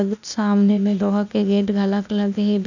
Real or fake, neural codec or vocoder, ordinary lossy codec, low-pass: fake; codec, 16 kHz, 0.8 kbps, ZipCodec; none; 7.2 kHz